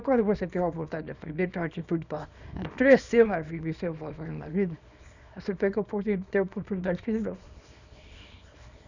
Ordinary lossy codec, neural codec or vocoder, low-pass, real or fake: none; codec, 24 kHz, 0.9 kbps, WavTokenizer, small release; 7.2 kHz; fake